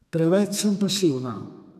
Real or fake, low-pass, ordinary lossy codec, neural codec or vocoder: fake; 14.4 kHz; none; codec, 32 kHz, 1.9 kbps, SNAC